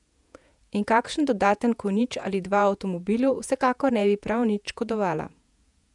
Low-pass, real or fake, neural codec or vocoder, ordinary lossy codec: 10.8 kHz; fake; vocoder, 48 kHz, 128 mel bands, Vocos; none